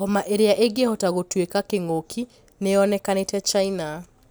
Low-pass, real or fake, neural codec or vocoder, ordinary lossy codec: none; real; none; none